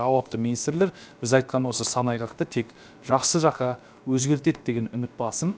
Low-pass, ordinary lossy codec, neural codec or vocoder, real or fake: none; none; codec, 16 kHz, about 1 kbps, DyCAST, with the encoder's durations; fake